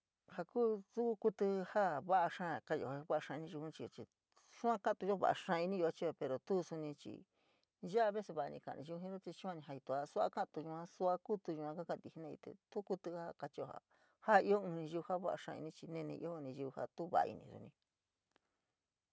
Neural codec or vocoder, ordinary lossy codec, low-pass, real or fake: none; none; none; real